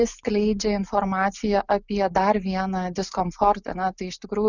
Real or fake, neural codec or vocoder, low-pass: real; none; 7.2 kHz